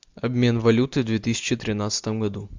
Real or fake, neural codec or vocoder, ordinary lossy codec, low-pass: real; none; MP3, 64 kbps; 7.2 kHz